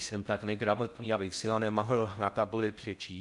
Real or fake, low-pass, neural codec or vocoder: fake; 10.8 kHz; codec, 16 kHz in and 24 kHz out, 0.6 kbps, FocalCodec, streaming, 4096 codes